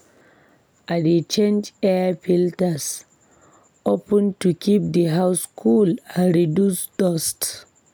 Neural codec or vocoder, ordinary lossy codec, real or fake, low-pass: none; none; real; none